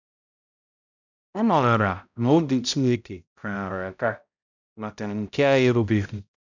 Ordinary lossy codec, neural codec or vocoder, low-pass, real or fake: none; codec, 16 kHz, 0.5 kbps, X-Codec, HuBERT features, trained on balanced general audio; 7.2 kHz; fake